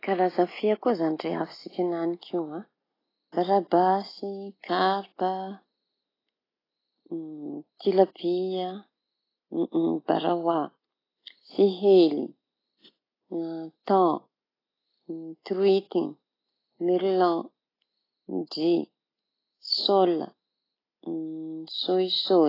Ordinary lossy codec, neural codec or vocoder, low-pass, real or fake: AAC, 24 kbps; none; 5.4 kHz; real